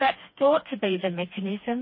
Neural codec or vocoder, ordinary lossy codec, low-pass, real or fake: codec, 16 kHz, 2 kbps, FreqCodec, smaller model; MP3, 24 kbps; 5.4 kHz; fake